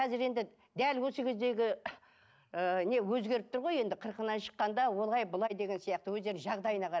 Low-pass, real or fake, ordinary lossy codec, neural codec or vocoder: none; real; none; none